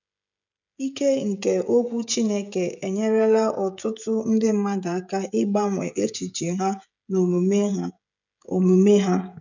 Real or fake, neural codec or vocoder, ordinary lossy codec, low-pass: fake; codec, 16 kHz, 16 kbps, FreqCodec, smaller model; none; 7.2 kHz